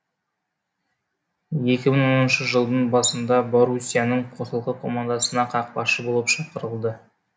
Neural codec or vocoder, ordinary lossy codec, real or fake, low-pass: none; none; real; none